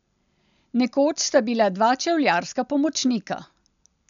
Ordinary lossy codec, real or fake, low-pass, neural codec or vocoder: none; real; 7.2 kHz; none